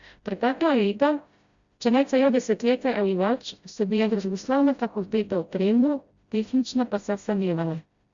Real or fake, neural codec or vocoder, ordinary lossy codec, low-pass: fake; codec, 16 kHz, 0.5 kbps, FreqCodec, smaller model; Opus, 64 kbps; 7.2 kHz